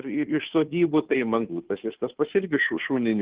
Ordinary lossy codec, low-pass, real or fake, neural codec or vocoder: Opus, 16 kbps; 3.6 kHz; fake; codec, 16 kHz, 2 kbps, FunCodec, trained on Chinese and English, 25 frames a second